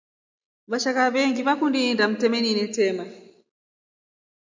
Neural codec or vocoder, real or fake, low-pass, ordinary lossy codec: none; real; 7.2 kHz; MP3, 64 kbps